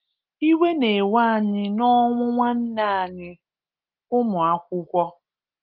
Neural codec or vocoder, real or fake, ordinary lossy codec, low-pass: none; real; Opus, 24 kbps; 5.4 kHz